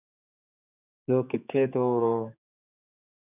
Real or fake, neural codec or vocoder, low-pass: fake; codec, 16 kHz, 4 kbps, X-Codec, HuBERT features, trained on general audio; 3.6 kHz